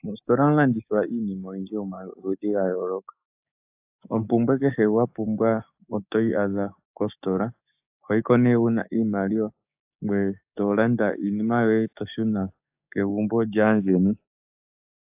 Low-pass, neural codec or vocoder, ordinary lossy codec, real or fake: 3.6 kHz; codec, 16 kHz, 6 kbps, DAC; AAC, 32 kbps; fake